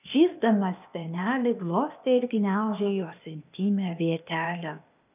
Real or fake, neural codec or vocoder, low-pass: fake; codec, 16 kHz, 0.8 kbps, ZipCodec; 3.6 kHz